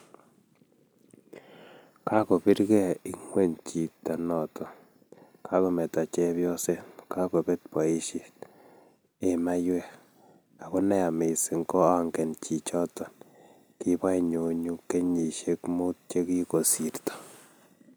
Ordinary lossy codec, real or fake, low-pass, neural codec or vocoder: none; real; none; none